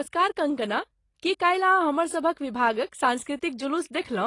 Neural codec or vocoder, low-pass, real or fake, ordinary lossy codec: none; 10.8 kHz; real; AAC, 32 kbps